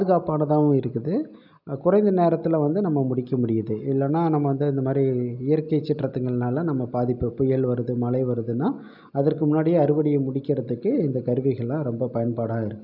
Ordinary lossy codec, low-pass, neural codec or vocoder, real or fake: none; 5.4 kHz; none; real